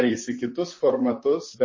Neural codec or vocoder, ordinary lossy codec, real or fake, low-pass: vocoder, 44.1 kHz, 128 mel bands, Pupu-Vocoder; MP3, 32 kbps; fake; 7.2 kHz